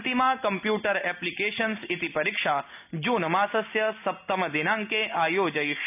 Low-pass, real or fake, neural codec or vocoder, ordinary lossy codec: 3.6 kHz; real; none; none